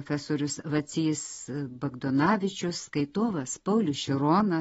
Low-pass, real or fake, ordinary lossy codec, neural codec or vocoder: 19.8 kHz; real; AAC, 24 kbps; none